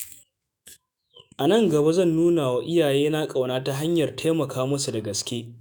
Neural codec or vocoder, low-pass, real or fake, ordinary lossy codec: autoencoder, 48 kHz, 128 numbers a frame, DAC-VAE, trained on Japanese speech; none; fake; none